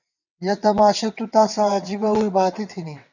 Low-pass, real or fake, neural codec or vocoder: 7.2 kHz; fake; vocoder, 22.05 kHz, 80 mel bands, WaveNeXt